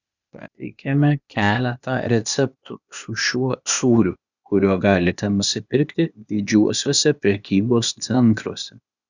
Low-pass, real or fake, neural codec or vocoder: 7.2 kHz; fake; codec, 16 kHz, 0.8 kbps, ZipCodec